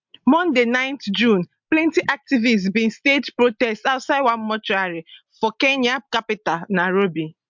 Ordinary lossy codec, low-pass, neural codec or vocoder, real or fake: MP3, 64 kbps; 7.2 kHz; none; real